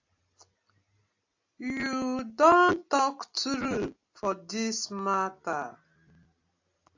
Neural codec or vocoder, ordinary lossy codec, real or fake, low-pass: none; AAC, 48 kbps; real; 7.2 kHz